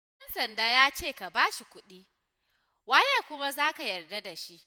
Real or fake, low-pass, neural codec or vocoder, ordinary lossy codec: fake; none; vocoder, 48 kHz, 128 mel bands, Vocos; none